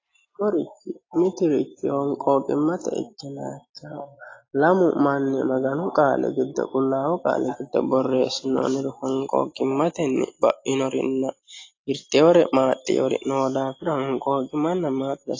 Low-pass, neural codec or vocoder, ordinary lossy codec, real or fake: 7.2 kHz; none; AAC, 32 kbps; real